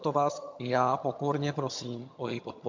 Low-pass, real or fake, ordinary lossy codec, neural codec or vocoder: 7.2 kHz; fake; MP3, 48 kbps; vocoder, 22.05 kHz, 80 mel bands, HiFi-GAN